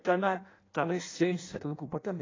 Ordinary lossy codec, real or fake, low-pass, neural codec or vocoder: AAC, 32 kbps; fake; 7.2 kHz; codec, 16 kHz in and 24 kHz out, 0.6 kbps, FireRedTTS-2 codec